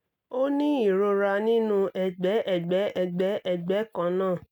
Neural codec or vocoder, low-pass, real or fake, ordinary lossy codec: none; 19.8 kHz; real; none